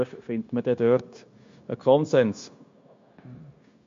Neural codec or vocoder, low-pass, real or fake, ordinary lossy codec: codec, 16 kHz, 0.9 kbps, LongCat-Audio-Codec; 7.2 kHz; fake; MP3, 48 kbps